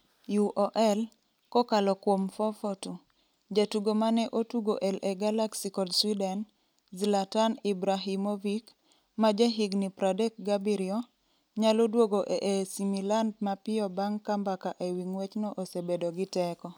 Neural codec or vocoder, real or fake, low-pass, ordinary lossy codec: none; real; none; none